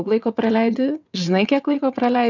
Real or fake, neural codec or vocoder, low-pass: fake; vocoder, 22.05 kHz, 80 mel bands, WaveNeXt; 7.2 kHz